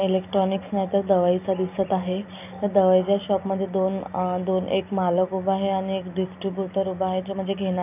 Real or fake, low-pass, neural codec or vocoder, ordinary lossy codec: real; 3.6 kHz; none; none